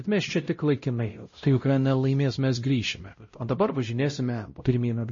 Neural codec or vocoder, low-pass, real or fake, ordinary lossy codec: codec, 16 kHz, 0.5 kbps, X-Codec, WavLM features, trained on Multilingual LibriSpeech; 7.2 kHz; fake; MP3, 32 kbps